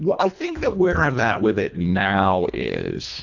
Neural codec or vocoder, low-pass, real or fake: codec, 24 kHz, 1.5 kbps, HILCodec; 7.2 kHz; fake